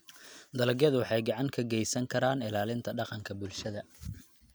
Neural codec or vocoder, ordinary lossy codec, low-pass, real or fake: none; none; none; real